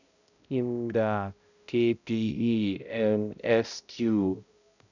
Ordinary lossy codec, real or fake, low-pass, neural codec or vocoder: none; fake; 7.2 kHz; codec, 16 kHz, 0.5 kbps, X-Codec, HuBERT features, trained on balanced general audio